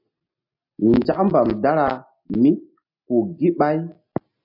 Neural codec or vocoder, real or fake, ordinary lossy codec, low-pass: none; real; MP3, 32 kbps; 5.4 kHz